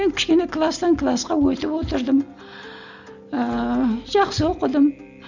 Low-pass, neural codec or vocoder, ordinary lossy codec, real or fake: 7.2 kHz; none; none; real